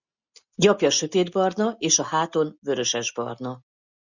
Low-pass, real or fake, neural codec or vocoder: 7.2 kHz; real; none